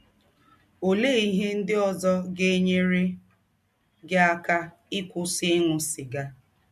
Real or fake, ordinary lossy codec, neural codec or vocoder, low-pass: real; MP3, 64 kbps; none; 14.4 kHz